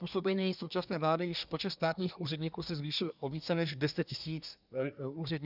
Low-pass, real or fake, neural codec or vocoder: 5.4 kHz; fake; codec, 24 kHz, 1 kbps, SNAC